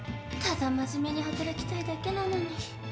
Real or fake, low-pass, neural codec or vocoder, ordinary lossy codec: real; none; none; none